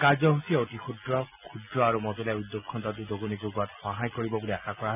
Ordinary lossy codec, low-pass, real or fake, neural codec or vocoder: none; 3.6 kHz; real; none